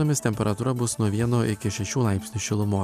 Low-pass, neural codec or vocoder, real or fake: 14.4 kHz; none; real